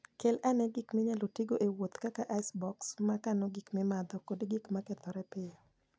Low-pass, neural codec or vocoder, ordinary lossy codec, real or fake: none; none; none; real